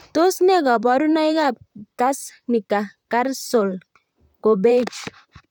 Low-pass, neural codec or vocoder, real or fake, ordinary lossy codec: 19.8 kHz; vocoder, 44.1 kHz, 128 mel bands, Pupu-Vocoder; fake; none